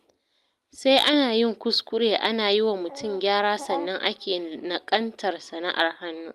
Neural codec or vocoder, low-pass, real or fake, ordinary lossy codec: none; 14.4 kHz; real; Opus, 32 kbps